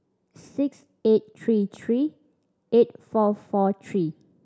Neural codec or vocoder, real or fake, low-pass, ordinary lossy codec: none; real; none; none